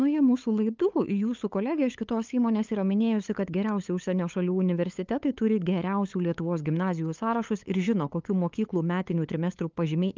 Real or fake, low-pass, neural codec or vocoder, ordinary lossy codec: fake; 7.2 kHz; codec, 16 kHz, 8 kbps, FunCodec, trained on LibriTTS, 25 frames a second; Opus, 24 kbps